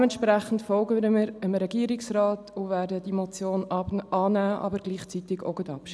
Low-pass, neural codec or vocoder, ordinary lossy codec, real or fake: none; none; none; real